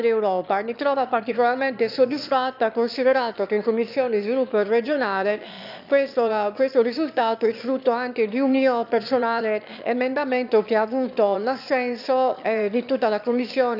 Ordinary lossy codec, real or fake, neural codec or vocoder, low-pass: none; fake; autoencoder, 22.05 kHz, a latent of 192 numbers a frame, VITS, trained on one speaker; 5.4 kHz